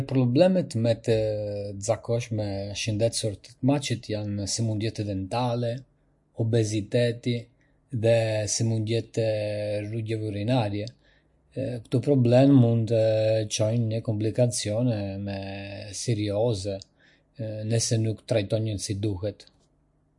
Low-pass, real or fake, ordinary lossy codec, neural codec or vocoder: 10.8 kHz; real; MP3, 48 kbps; none